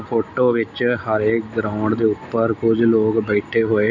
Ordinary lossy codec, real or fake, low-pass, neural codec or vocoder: none; real; 7.2 kHz; none